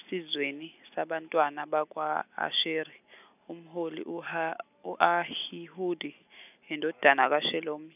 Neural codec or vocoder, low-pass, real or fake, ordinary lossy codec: none; 3.6 kHz; real; none